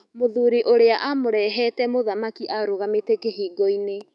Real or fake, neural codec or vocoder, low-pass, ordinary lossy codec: fake; autoencoder, 48 kHz, 128 numbers a frame, DAC-VAE, trained on Japanese speech; 10.8 kHz; none